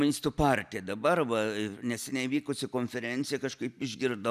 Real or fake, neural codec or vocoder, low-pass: real; none; 14.4 kHz